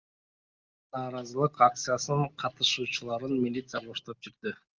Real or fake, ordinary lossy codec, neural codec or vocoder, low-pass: real; Opus, 32 kbps; none; 7.2 kHz